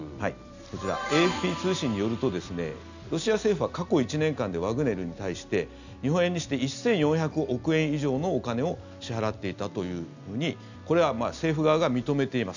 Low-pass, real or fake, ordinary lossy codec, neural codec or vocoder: 7.2 kHz; real; none; none